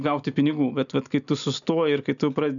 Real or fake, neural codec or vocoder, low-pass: real; none; 7.2 kHz